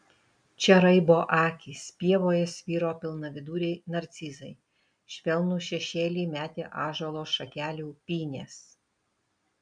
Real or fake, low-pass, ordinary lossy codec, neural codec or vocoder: real; 9.9 kHz; MP3, 96 kbps; none